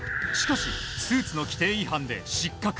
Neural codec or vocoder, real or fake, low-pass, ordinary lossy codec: none; real; none; none